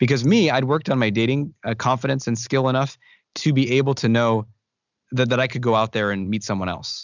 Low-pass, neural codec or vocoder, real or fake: 7.2 kHz; none; real